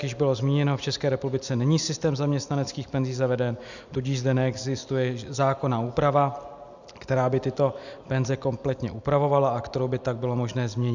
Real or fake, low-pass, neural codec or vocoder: real; 7.2 kHz; none